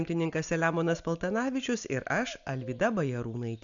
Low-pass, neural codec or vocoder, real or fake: 7.2 kHz; none; real